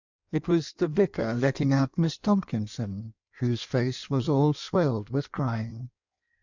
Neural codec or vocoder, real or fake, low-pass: codec, 16 kHz in and 24 kHz out, 1.1 kbps, FireRedTTS-2 codec; fake; 7.2 kHz